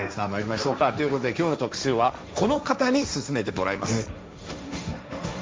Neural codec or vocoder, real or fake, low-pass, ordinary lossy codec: codec, 16 kHz, 1.1 kbps, Voila-Tokenizer; fake; none; none